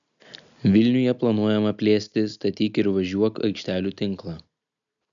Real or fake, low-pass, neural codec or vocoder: real; 7.2 kHz; none